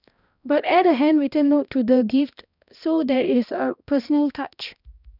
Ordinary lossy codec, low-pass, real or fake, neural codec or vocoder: none; 5.4 kHz; fake; codec, 16 kHz, 1 kbps, X-Codec, HuBERT features, trained on balanced general audio